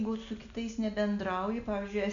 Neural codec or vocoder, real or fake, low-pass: none; real; 7.2 kHz